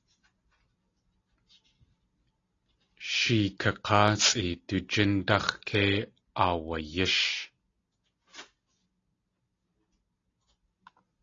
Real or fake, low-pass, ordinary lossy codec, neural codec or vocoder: real; 7.2 kHz; AAC, 32 kbps; none